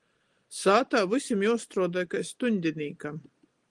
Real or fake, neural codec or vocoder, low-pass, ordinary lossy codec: real; none; 10.8 kHz; Opus, 24 kbps